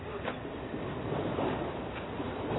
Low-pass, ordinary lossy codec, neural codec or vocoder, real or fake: 7.2 kHz; AAC, 16 kbps; none; real